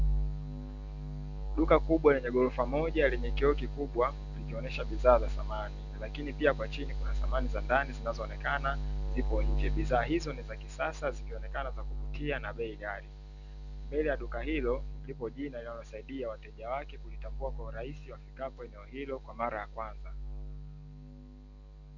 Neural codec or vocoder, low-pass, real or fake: none; 7.2 kHz; real